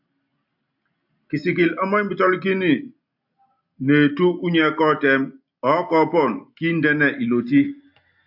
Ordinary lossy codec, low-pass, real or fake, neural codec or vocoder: AAC, 48 kbps; 5.4 kHz; real; none